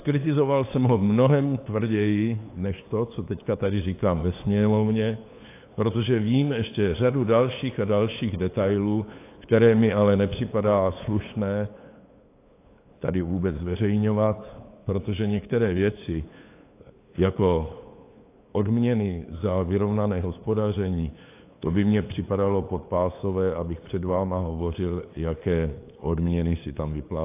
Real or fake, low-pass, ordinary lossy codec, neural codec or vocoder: fake; 3.6 kHz; AAC, 24 kbps; codec, 16 kHz, 8 kbps, FunCodec, trained on LibriTTS, 25 frames a second